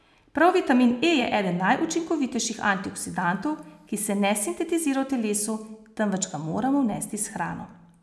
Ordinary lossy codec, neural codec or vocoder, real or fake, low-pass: none; none; real; none